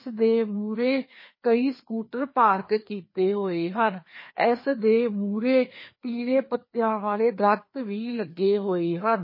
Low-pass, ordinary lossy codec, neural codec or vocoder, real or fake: 5.4 kHz; MP3, 24 kbps; codec, 16 kHz, 2 kbps, FreqCodec, larger model; fake